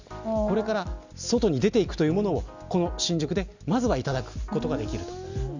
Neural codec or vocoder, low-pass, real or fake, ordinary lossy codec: none; 7.2 kHz; real; none